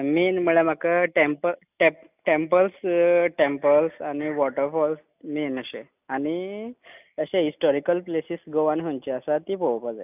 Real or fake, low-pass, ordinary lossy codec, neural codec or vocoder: real; 3.6 kHz; none; none